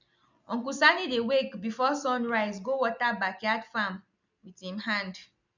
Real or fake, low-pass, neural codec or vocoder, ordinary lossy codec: real; 7.2 kHz; none; none